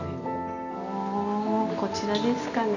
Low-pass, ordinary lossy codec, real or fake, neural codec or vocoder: 7.2 kHz; none; real; none